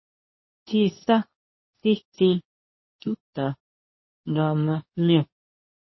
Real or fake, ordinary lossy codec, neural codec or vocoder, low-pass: fake; MP3, 24 kbps; codec, 24 kHz, 0.9 kbps, WavTokenizer, medium speech release version 2; 7.2 kHz